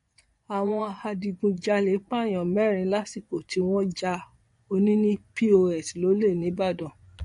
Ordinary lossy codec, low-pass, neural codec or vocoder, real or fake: MP3, 48 kbps; 10.8 kHz; vocoder, 24 kHz, 100 mel bands, Vocos; fake